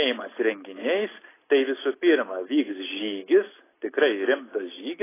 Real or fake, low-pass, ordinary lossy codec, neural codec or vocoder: real; 3.6 kHz; AAC, 16 kbps; none